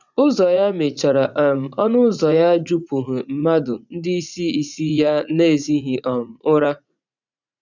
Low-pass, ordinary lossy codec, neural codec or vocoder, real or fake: 7.2 kHz; none; vocoder, 44.1 kHz, 80 mel bands, Vocos; fake